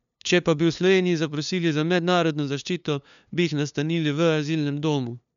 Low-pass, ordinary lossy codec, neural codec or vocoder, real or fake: 7.2 kHz; none; codec, 16 kHz, 2 kbps, FunCodec, trained on LibriTTS, 25 frames a second; fake